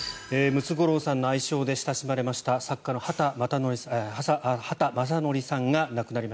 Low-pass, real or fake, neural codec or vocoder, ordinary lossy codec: none; real; none; none